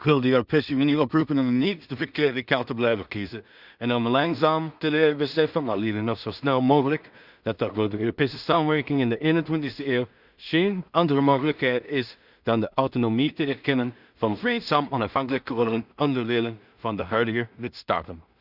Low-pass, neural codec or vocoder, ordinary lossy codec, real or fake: 5.4 kHz; codec, 16 kHz in and 24 kHz out, 0.4 kbps, LongCat-Audio-Codec, two codebook decoder; Opus, 64 kbps; fake